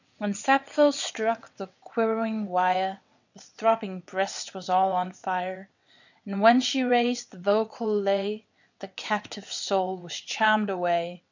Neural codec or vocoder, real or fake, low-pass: vocoder, 22.05 kHz, 80 mel bands, WaveNeXt; fake; 7.2 kHz